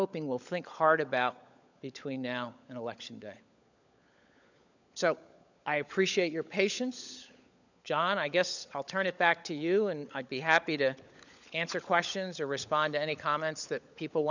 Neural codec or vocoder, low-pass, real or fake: codec, 16 kHz, 16 kbps, FunCodec, trained on LibriTTS, 50 frames a second; 7.2 kHz; fake